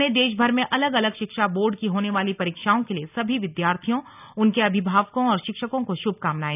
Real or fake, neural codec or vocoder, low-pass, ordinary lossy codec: real; none; 3.6 kHz; none